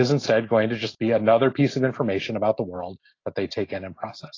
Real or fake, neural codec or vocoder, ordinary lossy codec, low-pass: real; none; AAC, 32 kbps; 7.2 kHz